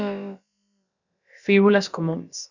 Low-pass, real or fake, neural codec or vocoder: 7.2 kHz; fake; codec, 16 kHz, about 1 kbps, DyCAST, with the encoder's durations